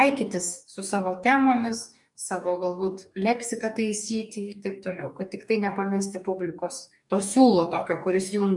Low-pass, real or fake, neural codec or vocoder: 10.8 kHz; fake; codec, 44.1 kHz, 2.6 kbps, DAC